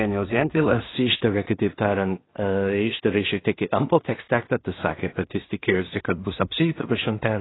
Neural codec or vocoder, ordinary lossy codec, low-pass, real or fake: codec, 16 kHz in and 24 kHz out, 0.4 kbps, LongCat-Audio-Codec, two codebook decoder; AAC, 16 kbps; 7.2 kHz; fake